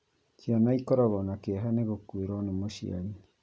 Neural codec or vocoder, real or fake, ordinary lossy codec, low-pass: none; real; none; none